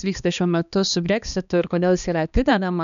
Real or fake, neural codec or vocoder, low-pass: fake; codec, 16 kHz, 2 kbps, X-Codec, HuBERT features, trained on balanced general audio; 7.2 kHz